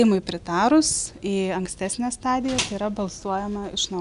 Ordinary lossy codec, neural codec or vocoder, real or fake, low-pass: MP3, 96 kbps; none; real; 10.8 kHz